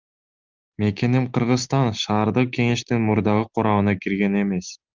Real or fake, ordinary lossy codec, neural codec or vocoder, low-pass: real; Opus, 24 kbps; none; 7.2 kHz